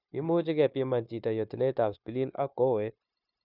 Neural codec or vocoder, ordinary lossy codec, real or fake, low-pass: codec, 16 kHz, 0.9 kbps, LongCat-Audio-Codec; none; fake; 5.4 kHz